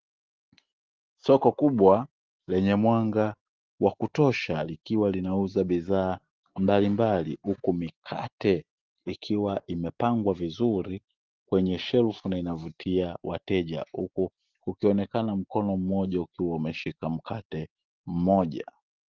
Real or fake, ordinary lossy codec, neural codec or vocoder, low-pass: real; Opus, 16 kbps; none; 7.2 kHz